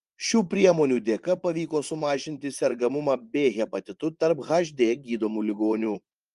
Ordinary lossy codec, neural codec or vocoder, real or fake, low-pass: Opus, 32 kbps; vocoder, 24 kHz, 100 mel bands, Vocos; fake; 10.8 kHz